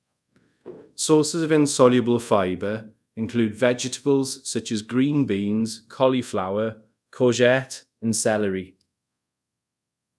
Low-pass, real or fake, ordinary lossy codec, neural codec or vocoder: none; fake; none; codec, 24 kHz, 0.5 kbps, DualCodec